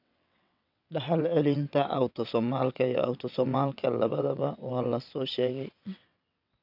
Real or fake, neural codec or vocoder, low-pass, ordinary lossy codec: fake; vocoder, 22.05 kHz, 80 mel bands, WaveNeXt; 5.4 kHz; none